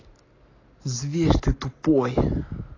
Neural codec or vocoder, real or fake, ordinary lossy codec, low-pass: none; real; AAC, 32 kbps; 7.2 kHz